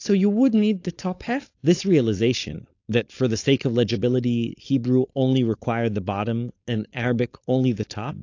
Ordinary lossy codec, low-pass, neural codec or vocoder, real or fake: AAC, 48 kbps; 7.2 kHz; codec, 16 kHz, 4.8 kbps, FACodec; fake